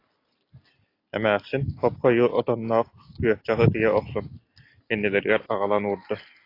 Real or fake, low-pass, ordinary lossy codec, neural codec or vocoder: real; 5.4 kHz; AAC, 32 kbps; none